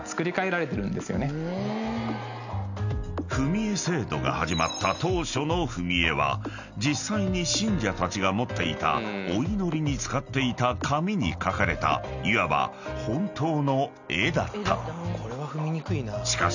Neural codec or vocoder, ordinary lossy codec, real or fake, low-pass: none; none; real; 7.2 kHz